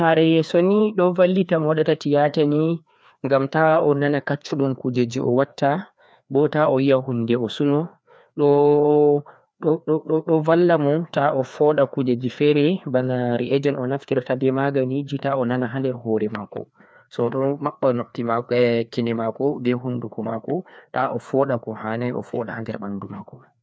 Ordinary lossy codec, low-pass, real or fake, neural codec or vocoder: none; none; fake; codec, 16 kHz, 2 kbps, FreqCodec, larger model